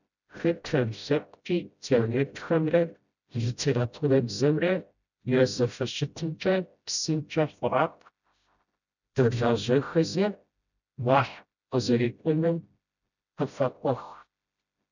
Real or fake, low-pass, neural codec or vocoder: fake; 7.2 kHz; codec, 16 kHz, 0.5 kbps, FreqCodec, smaller model